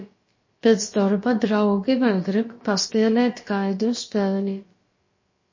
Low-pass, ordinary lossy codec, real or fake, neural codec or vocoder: 7.2 kHz; MP3, 32 kbps; fake; codec, 16 kHz, about 1 kbps, DyCAST, with the encoder's durations